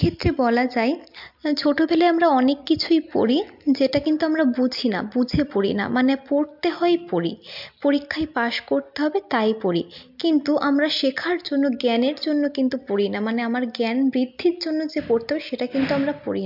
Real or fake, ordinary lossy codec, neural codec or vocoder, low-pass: real; MP3, 48 kbps; none; 5.4 kHz